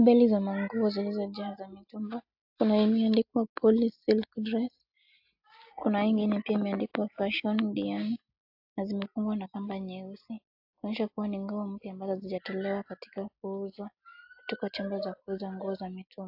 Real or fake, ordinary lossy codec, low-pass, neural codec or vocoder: real; AAC, 48 kbps; 5.4 kHz; none